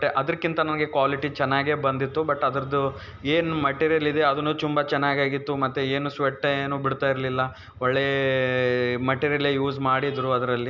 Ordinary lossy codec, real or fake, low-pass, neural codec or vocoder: none; real; 7.2 kHz; none